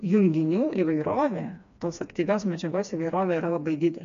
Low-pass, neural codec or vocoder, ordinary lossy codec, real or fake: 7.2 kHz; codec, 16 kHz, 2 kbps, FreqCodec, smaller model; MP3, 64 kbps; fake